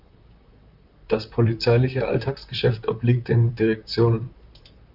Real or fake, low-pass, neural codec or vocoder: fake; 5.4 kHz; vocoder, 44.1 kHz, 128 mel bands, Pupu-Vocoder